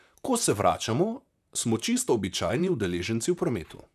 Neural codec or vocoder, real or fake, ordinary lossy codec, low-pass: vocoder, 44.1 kHz, 128 mel bands, Pupu-Vocoder; fake; none; 14.4 kHz